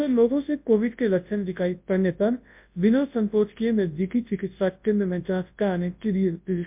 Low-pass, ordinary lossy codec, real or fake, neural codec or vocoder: 3.6 kHz; MP3, 32 kbps; fake; codec, 24 kHz, 0.9 kbps, WavTokenizer, large speech release